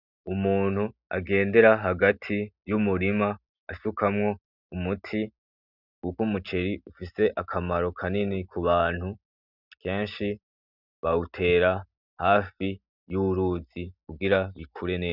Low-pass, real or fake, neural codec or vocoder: 5.4 kHz; real; none